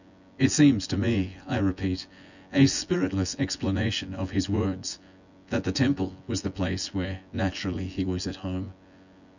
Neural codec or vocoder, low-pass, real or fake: vocoder, 24 kHz, 100 mel bands, Vocos; 7.2 kHz; fake